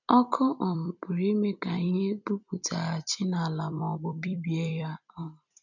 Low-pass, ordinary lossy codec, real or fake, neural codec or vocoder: 7.2 kHz; none; fake; vocoder, 44.1 kHz, 128 mel bands every 256 samples, BigVGAN v2